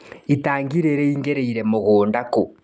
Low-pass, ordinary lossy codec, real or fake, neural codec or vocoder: none; none; real; none